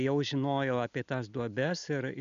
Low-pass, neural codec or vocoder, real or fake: 7.2 kHz; none; real